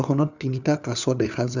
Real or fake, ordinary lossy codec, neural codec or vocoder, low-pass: fake; none; codec, 16 kHz, 4 kbps, FunCodec, trained on LibriTTS, 50 frames a second; 7.2 kHz